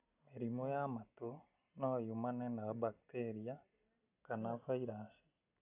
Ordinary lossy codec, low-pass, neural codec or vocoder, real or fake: none; 3.6 kHz; none; real